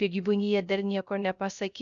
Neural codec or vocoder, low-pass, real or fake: codec, 16 kHz, 0.3 kbps, FocalCodec; 7.2 kHz; fake